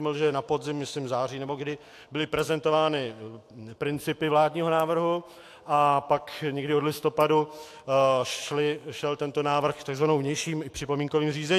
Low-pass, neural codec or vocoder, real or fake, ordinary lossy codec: 14.4 kHz; autoencoder, 48 kHz, 128 numbers a frame, DAC-VAE, trained on Japanese speech; fake; AAC, 64 kbps